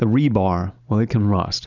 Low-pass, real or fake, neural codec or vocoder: 7.2 kHz; real; none